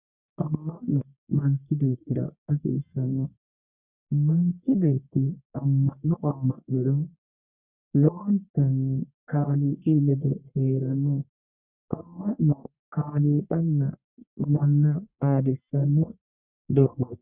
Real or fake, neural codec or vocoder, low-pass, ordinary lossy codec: fake; codec, 44.1 kHz, 1.7 kbps, Pupu-Codec; 3.6 kHz; Opus, 64 kbps